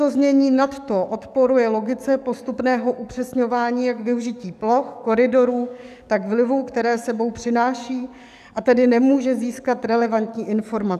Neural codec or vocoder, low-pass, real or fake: codec, 44.1 kHz, 7.8 kbps, DAC; 14.4 kHz; fake